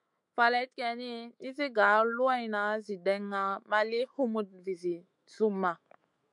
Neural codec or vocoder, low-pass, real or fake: autoencoder, 48 kHz, 128 numbers a frame, DAC-VAE, trained on Japanese speech; 10.8 kHz; fake